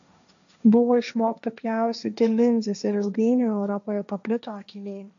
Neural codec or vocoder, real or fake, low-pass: codec, 16 kHz, 1.1 kbps, Voila-Tokenizer; fake; 7.2 kHz